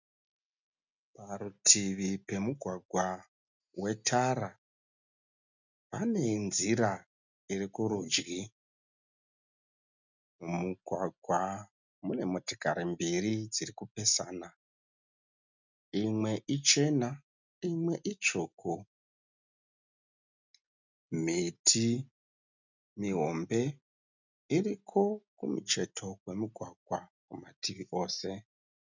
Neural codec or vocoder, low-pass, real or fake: none; 7.2 kHz; real